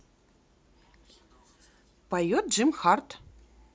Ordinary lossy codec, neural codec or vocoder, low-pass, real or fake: none; none; none; real